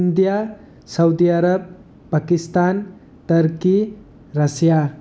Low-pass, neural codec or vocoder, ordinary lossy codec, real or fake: none; none; none; real